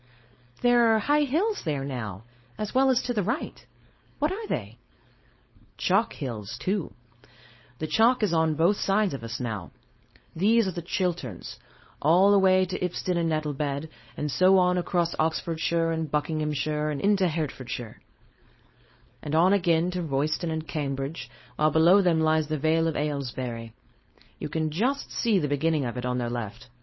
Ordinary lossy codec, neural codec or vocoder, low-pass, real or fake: MP3, 24 kbps; codec, 16 kHz, 4.8 kbps, FACodec; 7.2 kHz; fake